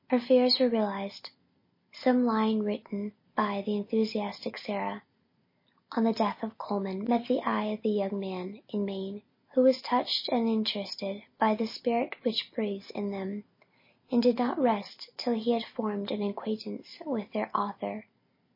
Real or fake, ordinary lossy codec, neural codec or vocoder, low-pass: real; MP3, 24 kbps; none; 5.4 kHz